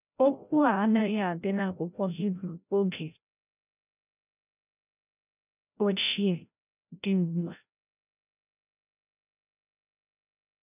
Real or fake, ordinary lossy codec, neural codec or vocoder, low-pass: fake; none; codec, 16 kHz, 0.5 kbps, FreqCodec, larger model; 3.6 kHz